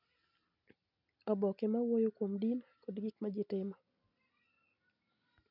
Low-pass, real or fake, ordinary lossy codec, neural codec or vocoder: 5.4 kHz; real; none; none